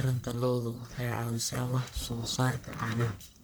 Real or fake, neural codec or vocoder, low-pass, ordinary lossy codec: fake; codec, 44.1 kHz, 1.7 kbps, Pupu-Codec; none; none